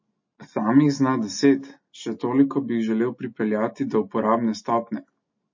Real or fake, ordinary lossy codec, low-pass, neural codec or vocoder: real; MP3, 32 kbps; 7.2 kHz; none